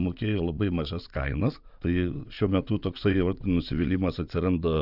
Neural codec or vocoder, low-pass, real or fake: vocoder, 22.05 kHz, 80 mel bands, WaveNeXt; 5.4 kHz; fake